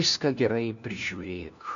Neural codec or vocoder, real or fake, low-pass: codec, 16 kHz, 1 kbps, FunCodec, trained on LibriTTS, 50 frames a second; fake; 7.2 kHz